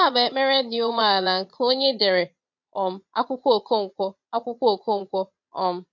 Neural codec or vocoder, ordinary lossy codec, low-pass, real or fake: vocoder, 22.05 kHz, 80 mel bands, Vocos; MP3, 48 kbps; 7.2 kHz; fake